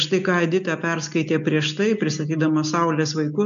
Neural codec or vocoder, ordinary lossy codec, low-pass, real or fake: none; MP3, 96 kbps; 7.2 kHz; real